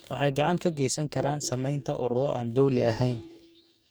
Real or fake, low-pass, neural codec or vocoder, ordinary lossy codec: fake; none; codec, 44.1 kHz, 2.6 kbps, DAC; none